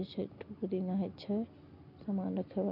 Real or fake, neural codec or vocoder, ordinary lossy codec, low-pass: real; none; none; 5.4 kHz